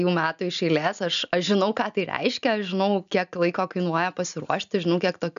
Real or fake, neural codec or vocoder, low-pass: real; none; 7.2 kHz